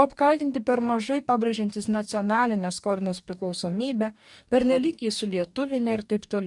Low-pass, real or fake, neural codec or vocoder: 10.8 kHz; fake; codec, 44.1 kHz, 2.6 kbps, DAC